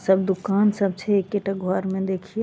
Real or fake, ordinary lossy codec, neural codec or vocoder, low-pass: real; none; none; none